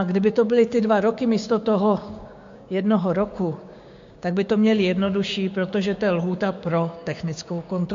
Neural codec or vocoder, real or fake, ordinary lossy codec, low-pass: codec, 16 kHz, 6 kbps, DAC; fake; MP3, 48 kbps; 7.2 kHz